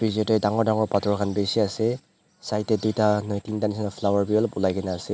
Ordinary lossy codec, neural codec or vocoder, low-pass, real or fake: none; none; none; real